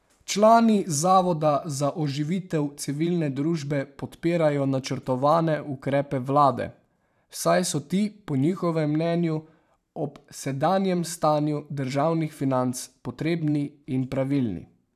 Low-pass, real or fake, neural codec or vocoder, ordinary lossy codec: 14.4 kHz; fake; vocoder, 44.1 kHz, 128 mel bands every 512 samples, BigVGAN v2; none